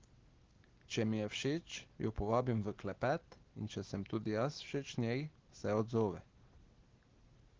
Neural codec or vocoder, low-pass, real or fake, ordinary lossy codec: none; 7.2 kHz; real; Opus, 16 kbps